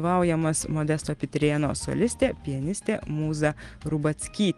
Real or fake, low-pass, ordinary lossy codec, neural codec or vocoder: real; 10.8 kHz; Opus, 24 kbps; none